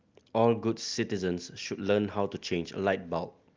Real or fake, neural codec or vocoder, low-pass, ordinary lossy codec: real; none; 7.2 kHz; Opus, 32 kbps